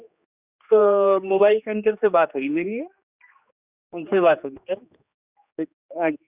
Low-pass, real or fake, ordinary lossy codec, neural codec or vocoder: 3.6 kHz; fake; Opus, 64 kbps; codec, 16 kHz, 2 kbps, X-Codec, HuBERT features, trained on general audio